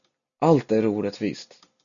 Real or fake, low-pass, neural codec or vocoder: real; 7.2 kHz; none